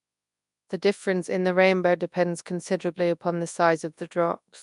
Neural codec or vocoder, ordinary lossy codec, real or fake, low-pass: codec, 24 kHz, 0.9 kbps, WavTokenizer, large speech release; none; fake; 10.8 kHz